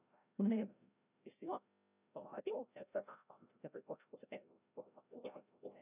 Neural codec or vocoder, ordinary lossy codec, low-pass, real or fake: codec, 16 kHz, 0.5 kbps, FreqCodec, larger model; none; 3.6 kHz; fake